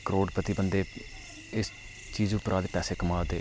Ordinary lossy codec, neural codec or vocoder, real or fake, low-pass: none; none; real; none